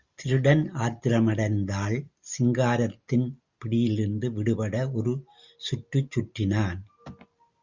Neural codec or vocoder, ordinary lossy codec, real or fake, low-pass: none; Opus, 64 kbps; real; 7.2 kHz